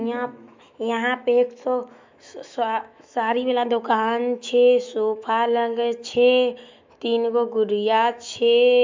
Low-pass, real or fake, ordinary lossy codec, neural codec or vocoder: 7.2 kHz; fake; MP3, 64 kbps; autoencoder, 48 kHz, 128 numbers a frame, DAC-VAE, trained on Japanese speech